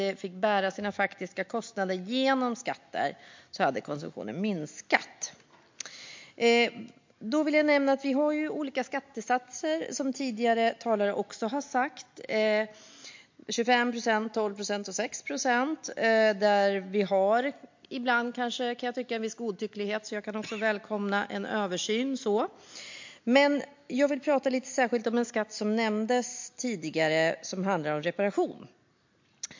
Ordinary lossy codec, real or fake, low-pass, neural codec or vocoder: MP3, 48 kbps; real; 7.2 kHz; none